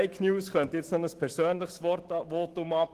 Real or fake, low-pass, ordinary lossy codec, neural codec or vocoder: fake; 14.4 kHz; Opus, 16 kbps; autoencoder, 48 kHz, 128 numbers a frame, DAC-VAE, trained on Japanese speech